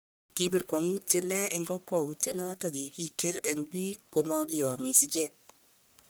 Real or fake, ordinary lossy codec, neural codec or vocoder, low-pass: fake; none; codec, 44.1 kHz, 1.7 kbps, Pupu-Codec; none